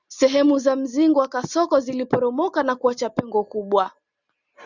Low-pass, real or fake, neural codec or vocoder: 7.2 kHz; real; none